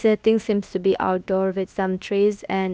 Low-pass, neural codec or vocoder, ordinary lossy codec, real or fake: none; codec, 16 kHz, about 1 kbps, DyCAST, with the encoder's durations; none; fake